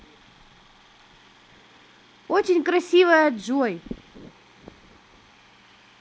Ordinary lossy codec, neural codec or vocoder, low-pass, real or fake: none; none; none; real